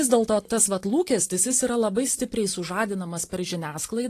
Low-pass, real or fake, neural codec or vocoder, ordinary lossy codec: 14.4 kHz; real; none; AAC, 48 kbps